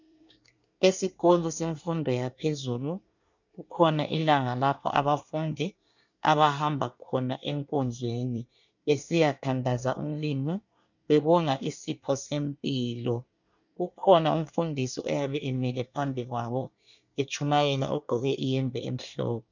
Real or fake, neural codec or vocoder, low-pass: fake; codec, 24 kHz, 1 kbps, SNAC; 7.2 kHz